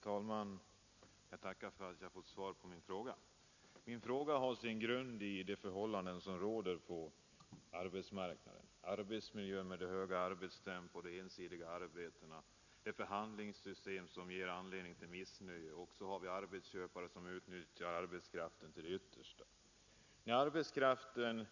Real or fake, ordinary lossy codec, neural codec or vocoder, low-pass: real; none; none; 7.2 kHz